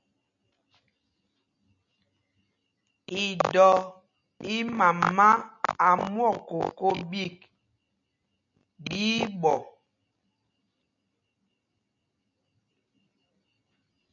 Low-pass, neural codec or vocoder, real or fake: 7.2 kHz; none; real